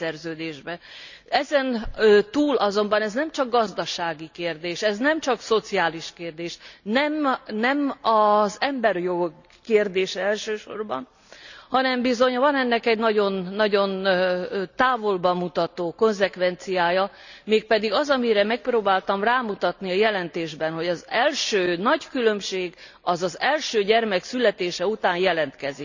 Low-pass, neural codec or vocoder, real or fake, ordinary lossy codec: 7.2 kHz; none; real; none